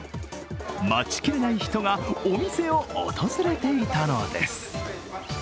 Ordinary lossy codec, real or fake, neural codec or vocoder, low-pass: none; real; none; none